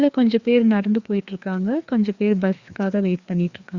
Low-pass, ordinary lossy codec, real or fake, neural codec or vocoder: 7.2 kHz; Opus, 64 kbps; fake; codec, 16 kHz, 2 kbps, FreqCodec, larger model